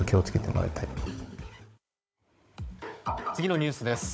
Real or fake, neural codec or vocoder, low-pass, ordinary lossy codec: fake; codec, 16 kHz, 16 kbps, FunCodec, trained on Chinese and English, 50 frames a second; none; none